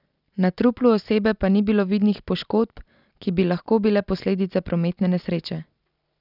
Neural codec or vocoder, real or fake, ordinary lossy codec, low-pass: none; real; none; 5.4 kHz